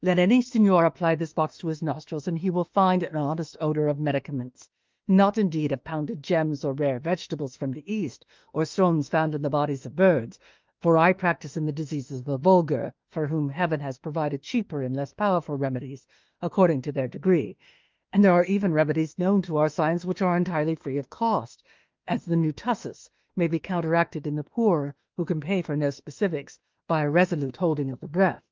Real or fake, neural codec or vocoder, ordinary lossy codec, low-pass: fake; autoencoder, 48 kHz, 32 numbers a frame, DAC-VAE, trained on Japanese speech; Opus, 32 kbps; 7.2 kHz